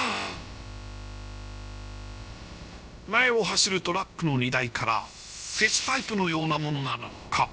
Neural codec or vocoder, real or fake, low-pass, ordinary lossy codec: codec, 16 kHz, about 1 kbps, DyCAST, with the encoder's durations; fake; none; none